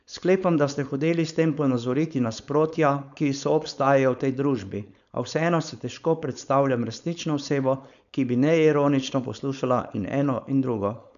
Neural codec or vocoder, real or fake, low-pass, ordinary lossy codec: codec, 16 kHz, 4.8 kbps, FACodec; fake; 7.2 kHz; none